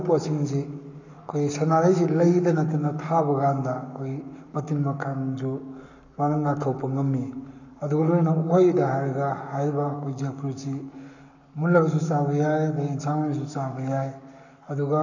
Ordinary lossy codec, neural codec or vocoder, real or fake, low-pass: none; codec, 44.1 kHz, 7.8 kbps, Pupu-Codec; fake; 7.2 kHz